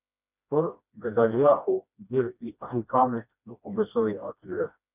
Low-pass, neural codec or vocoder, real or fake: 3.6 kHz; codec, 16 kHz, 1 kbps, FreqCodec, smaller model; fake